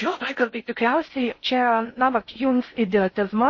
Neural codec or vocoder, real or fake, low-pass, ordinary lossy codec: codec, 16 kHz in and 24 kHz out, 0.6 kbps, FocalCodec, streaming, 4096 codes; fake; 7.2 kHz; MP3, 32 kbps